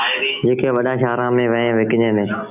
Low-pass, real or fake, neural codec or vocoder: 3.6 kHz; real; none